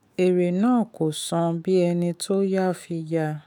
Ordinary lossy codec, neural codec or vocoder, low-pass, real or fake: none; autoencoder, 48 kHz, 128 numbers a frame, DAC-VAE, trained on Japanese speech; none; fake